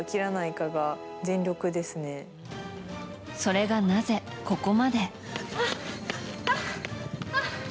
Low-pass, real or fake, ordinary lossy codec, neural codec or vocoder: none; real; none; none